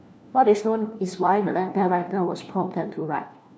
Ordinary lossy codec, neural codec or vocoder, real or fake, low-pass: none; codec, 16 kHz, 1 kbps, FunCodec, trained on LibriTTS, 50 frames a second; fake; none